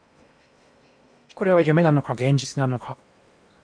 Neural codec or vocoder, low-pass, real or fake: codec, 16 kHz in and 24 kHz out, 0.8 kbps, FocalCodec, streaming, 65536 codes; 9.9 kHz; fake